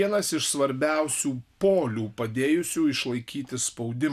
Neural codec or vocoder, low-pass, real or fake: none; 14.4 kHz; real